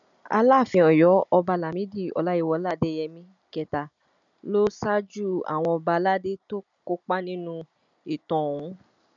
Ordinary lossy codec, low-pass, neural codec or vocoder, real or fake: none; 7.2 kHz; none; real